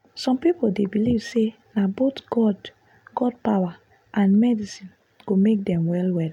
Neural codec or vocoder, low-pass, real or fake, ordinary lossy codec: none; 19.8 kHz; real; none